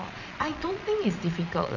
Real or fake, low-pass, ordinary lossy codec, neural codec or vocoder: fake; 7.2 kHz; none; vocoder, 22.05 kHz, 80 mel bands, Vocos